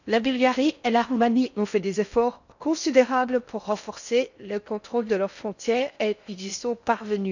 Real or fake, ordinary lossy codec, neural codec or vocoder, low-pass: fake; none; codec, 16 kHz in and 24 kHz out, 0.6 kbps, FocalCodec, streaming, 4096 codes; 7.2 kHz